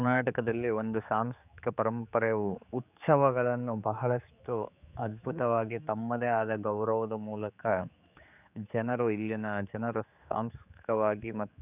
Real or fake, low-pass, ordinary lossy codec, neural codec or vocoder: fake; 3.6 kHz; none; codec, 16 kHz, 4 kbps, X-Codec, HuBERT features, trained on general audio